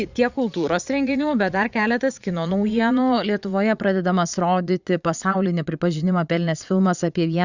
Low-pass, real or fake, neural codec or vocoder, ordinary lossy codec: 7.2 kHz; fake; vocoder, 22.05 kHz, 80 mel bands, Vocos; Opus, 64 kbps